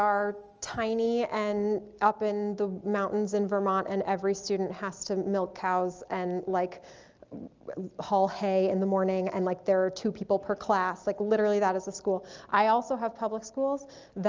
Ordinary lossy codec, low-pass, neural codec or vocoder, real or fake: Opus, 24 kbps; 7.2 kHz; none; real